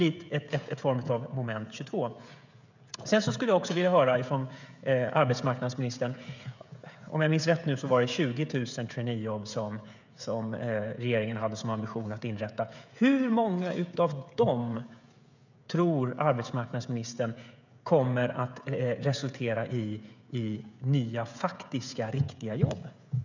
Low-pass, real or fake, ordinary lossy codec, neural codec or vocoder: 7.2 kHz; fake; none; codec, 16 kHz, 16 kbps, FreqCodec, smaller model